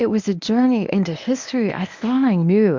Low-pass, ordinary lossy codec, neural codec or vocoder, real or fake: 7.2 kHz; MP3, 64 kbps; codec, 24 kHz, 0.9 kbps, WavTokenizer, medium speech release version 1; fake